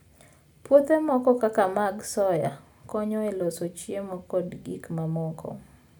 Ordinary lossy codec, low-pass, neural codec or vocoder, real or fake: none; none; none; real